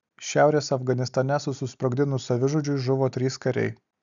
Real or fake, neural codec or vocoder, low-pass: real; none; 7.2 kHz